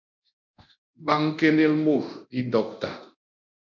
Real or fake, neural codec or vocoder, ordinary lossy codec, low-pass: fake; codec, 24 kHz, 0.9 kbps, DualCodec; MP3, 64 kbps; 7.2 kHz